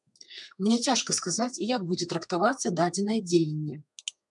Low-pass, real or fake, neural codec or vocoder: 10.8 kHz; fake; codec, 32 kHz, 1.9 kbps, SNAC